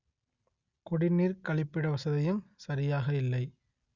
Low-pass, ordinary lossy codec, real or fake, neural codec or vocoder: 7.2 kHz; Opus, 64 kbps; real; none